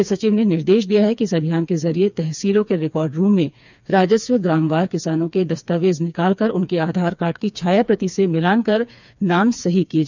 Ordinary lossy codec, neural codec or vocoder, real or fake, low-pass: none; codec, 16 kHz, 4 kbps, FreqCodec, smaller model; fake; 7.2 kHz